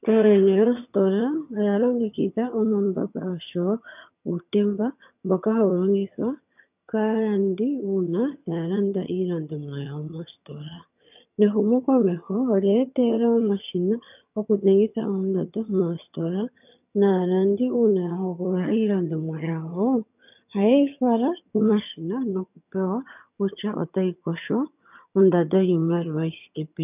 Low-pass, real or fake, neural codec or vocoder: 3.6 kHz; fake; vocoder, 22.05 kHz, 80 mel bands, HiFi-GAN